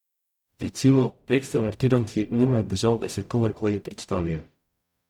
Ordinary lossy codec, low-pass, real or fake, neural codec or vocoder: none; 19.8 kHz; fake; codec, 44.1 kHz, 0.9 kbps, DAC